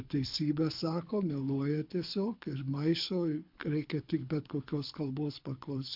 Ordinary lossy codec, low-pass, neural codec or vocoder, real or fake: MP3, 48 kbps; 5.4 kHz; codec, 24 kHz, 6 kbps, HILCodec; fake